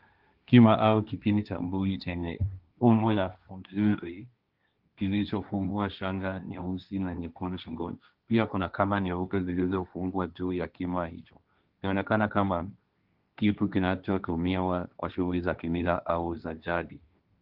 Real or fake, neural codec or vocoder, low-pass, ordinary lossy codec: fake; codec, 16 kHz, 1.1 kbps, Voila-Tokenizer; 5.4 kHz; Opus, 32 kbps